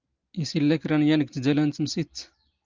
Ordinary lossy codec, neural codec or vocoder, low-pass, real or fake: Opus, 24 kbps; none; 7.2 kHz; real